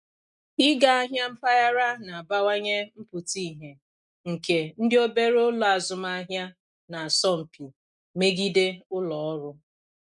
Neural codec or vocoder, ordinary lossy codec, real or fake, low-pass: none; none; real; 10.8 kHz